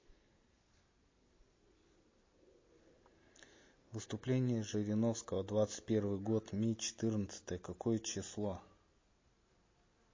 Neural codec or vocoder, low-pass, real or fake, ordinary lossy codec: none; 7.2 kHz; real; MP3, 32 kbps